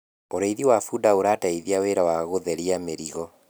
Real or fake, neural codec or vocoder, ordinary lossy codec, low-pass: real; none; none; none